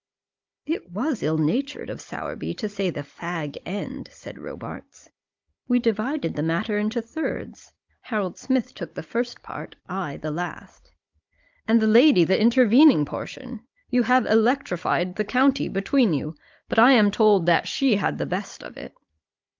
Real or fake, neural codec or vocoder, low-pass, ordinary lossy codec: fake; codec, 16 kHz, 16 kbps, FunCodec, trained on Chinese and English, 50 frames a second; 7.2 kHz; Opus, 24 kbps